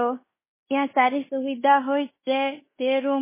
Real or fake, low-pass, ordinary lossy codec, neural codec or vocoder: fake; 3.6 kHz; MP3, 16 kbps; codec, 16 kHz in and 24 kHz out, 0.9 kbps, LongCat-Audio-Codec, fine tuned four codebook decoder